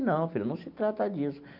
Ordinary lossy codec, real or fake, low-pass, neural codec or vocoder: none; real; 5.4 kHz; none